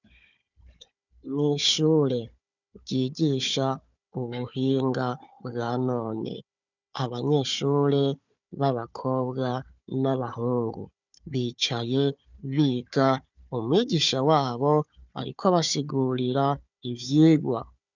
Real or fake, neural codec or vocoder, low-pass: fake; codec, 16 kHz, 4 kbps, FunCodec, trained on Chinese and English, 50 frames a second; 7.2 kHz